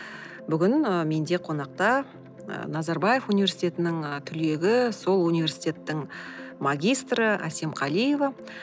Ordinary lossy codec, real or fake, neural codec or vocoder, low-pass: none; real; none; none